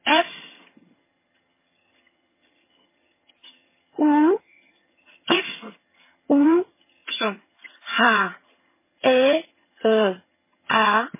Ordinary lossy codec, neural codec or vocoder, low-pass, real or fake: MP3, 16 kbps; vocoder, 22.05 kHz, 80 mel bands, HiFi-GAN; 3.6 kHz; fake